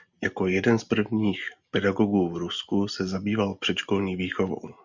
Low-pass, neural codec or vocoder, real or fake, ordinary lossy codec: 7.2 kHz; none; real; Opus, 64 kbps